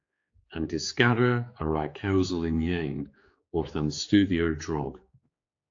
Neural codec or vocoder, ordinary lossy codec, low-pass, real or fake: codec, 16 kHz, 4 kbps, X-Codec, HuBERT features, trained on general audio; AAC, 48 kbps; 7.2 kHz; fake